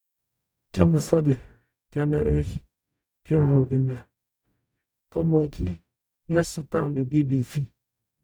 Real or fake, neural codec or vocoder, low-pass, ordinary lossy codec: fake; codec, 44.1 kHz, 0.9 kbps, DAC; none; none